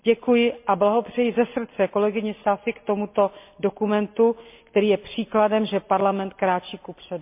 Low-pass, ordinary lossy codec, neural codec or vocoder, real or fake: 3.6 kHz; MP3, 32 kbps; none; real